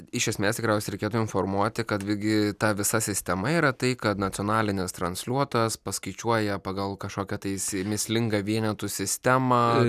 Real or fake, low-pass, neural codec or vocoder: real; 14.4 kHz; none